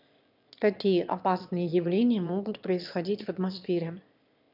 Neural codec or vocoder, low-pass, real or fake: autoencoder, 22.05 kHz, a latent of 192 numbers a frame, VITS, trained on one speaker; 5.4 kHz; fake